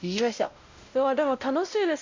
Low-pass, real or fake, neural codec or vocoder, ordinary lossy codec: 7.2 kHz; fake; codec, 16 kHz, 0.5 kbps, X-Codec, WavLM features, trained on Multilingual LibriSpeech; MP3, 48 kbps